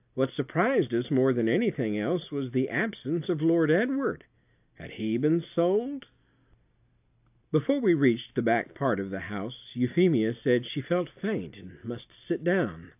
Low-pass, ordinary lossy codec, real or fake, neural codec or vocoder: 3.6 kHz; AAC, 32 kbps; real; none